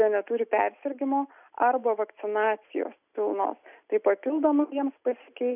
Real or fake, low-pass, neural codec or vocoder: real; 3.6 kHz; none